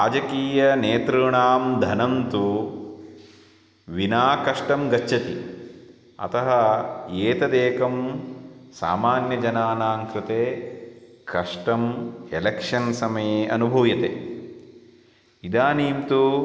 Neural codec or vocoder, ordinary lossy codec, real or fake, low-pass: none; none; real; none